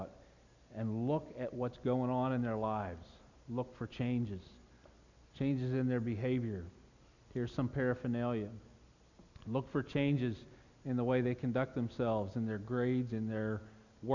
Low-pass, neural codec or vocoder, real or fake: 7.2 kHz; none; real